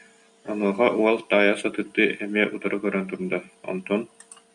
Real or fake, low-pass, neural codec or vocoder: real; 10.8 kHz; none